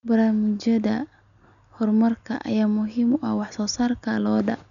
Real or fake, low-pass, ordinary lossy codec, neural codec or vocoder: real; 7.2 kHz; none; none